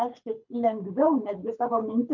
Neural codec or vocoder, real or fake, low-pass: codec, 24 kHz, 6 kbps, HILCodec; fake; 7.2 kHz